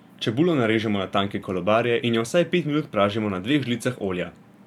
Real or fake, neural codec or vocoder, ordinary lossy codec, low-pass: fake; vocoder, 44.1 kHz, 128 mel bands every 512 samples, BigVGAN v2; none; 19.8 kHz